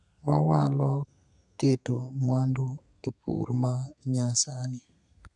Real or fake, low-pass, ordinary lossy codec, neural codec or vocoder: fake; 10.8 kHz; none; codec, 32 kHz, 1.9 kbps, SNAC